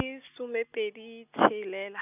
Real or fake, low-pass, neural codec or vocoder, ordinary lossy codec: real; 3.6 kHz; none; none